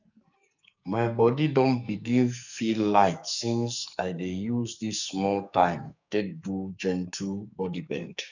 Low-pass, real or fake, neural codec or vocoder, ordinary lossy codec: 7.2 kHz; fake; codec, 44.1 kHz, 2.6 kbps, SNAC; none